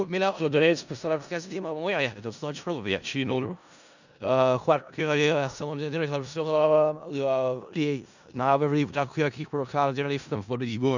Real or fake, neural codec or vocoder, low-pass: fake; codec, 16 kHz in and 24 kHz out, 0.4 kbps, LongCat-Audio-Codec, four codebook decoder; 7.2 kHz